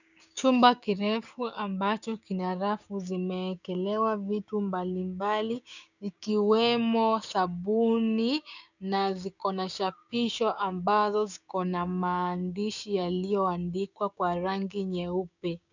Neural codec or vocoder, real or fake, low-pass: codec, 16 kHz, 6 kbps, DAC; fake; 7.2 kHz